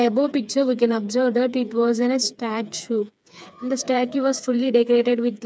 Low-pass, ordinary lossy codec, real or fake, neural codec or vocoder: none; none; fake; codec, 16 kHz, 4 kbps, FreqCodec, smaller model